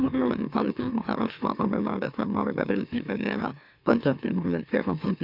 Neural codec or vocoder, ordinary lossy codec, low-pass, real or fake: autoencoder, 44.1 kHz, a latent of 192 numbers a frame, MeloTTS; none; 5.4 kHz; fake